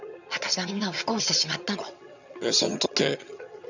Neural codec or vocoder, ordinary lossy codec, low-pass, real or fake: vocoder, 22.05 kHz, 80 mel bands, HiFi-GAN; none; 7.2 kHz; fake